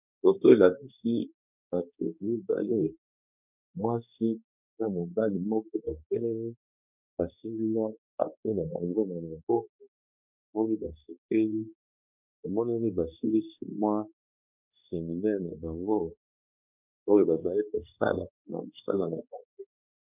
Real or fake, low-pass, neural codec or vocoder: fake; 3.6 kHz; codec, 16 kHz, 2 kbps, X-Codec, HuBERT features, trained on balanced general audio